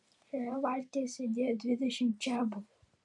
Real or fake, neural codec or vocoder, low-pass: fake; vocoder, 44.1 kHz, 128 mel bands every 512 samples, BigVGAN v2; 10.8 kHz